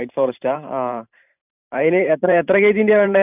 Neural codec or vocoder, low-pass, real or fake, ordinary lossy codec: vocoder, 44.1 kHz, 128 mel bands every 512 samples, BigVGAN v2; 3.6 kHz; fake; none